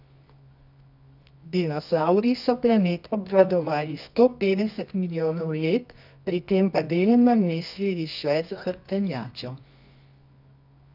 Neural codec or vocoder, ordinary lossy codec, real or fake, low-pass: codec, 24 kHz, 0.9 kbps, WavTokenizer, medium music audio release; none; fake; 5.4 kHz